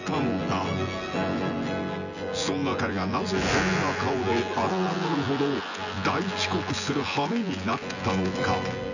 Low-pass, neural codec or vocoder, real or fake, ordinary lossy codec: 7.2 kHz; vocoder, 24 kHz, 100 mel bands, Vocos; fake; none